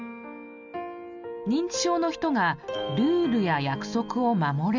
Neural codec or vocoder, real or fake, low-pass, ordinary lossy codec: none; real; 7.2 kHz; none